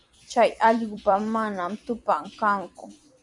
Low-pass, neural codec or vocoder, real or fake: 10.8 kHz; vocoder, 44.1 kHz, 128 mel bands every 256 samples, BigVGAN v2; fake